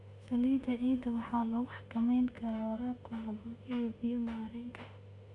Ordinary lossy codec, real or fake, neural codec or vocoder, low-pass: none; fake; autoencoder, 48 kHz, 32 numbers a frame, DAC-VAE, trained on Japanese speech; 10.8 kHz